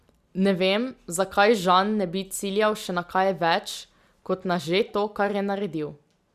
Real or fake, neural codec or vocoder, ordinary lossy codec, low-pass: real; none; Opus, 64 kbps; 14.4 kHz